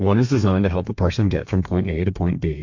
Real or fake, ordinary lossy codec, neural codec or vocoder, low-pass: fake; MP3, 48 kbps; codec, 32 kHz, 1.9 kbps, SNAC; 7.2 kHz